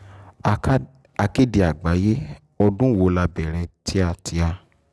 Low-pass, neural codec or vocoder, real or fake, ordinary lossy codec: none; none; real; none